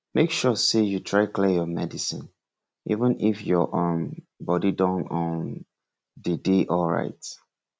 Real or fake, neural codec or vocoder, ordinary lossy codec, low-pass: real; none; none; none